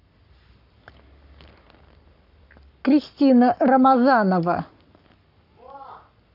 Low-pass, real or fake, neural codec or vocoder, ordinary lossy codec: 5.4 kHz; fake; codec, 44.1 kHz, 7.8 kbps, Pupu-Codec; AAC, 48 kbps